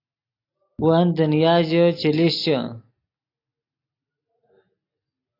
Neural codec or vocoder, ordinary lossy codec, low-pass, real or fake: none; AAC, 32 kbps; 5.4 kHz; real